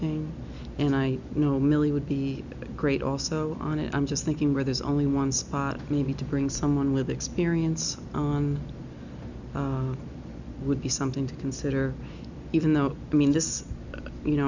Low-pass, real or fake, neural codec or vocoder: 7.2 kHz; real; none